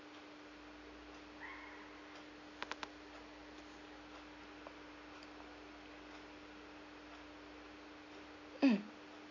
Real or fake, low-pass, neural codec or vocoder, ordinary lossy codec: real; 7.2 kHz; none; none